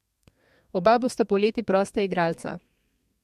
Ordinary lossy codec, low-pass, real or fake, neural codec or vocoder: MP3, 64 kbps; 14.4 kHz; fake; codec, 32 kHz, 1.9 kbps, SNAC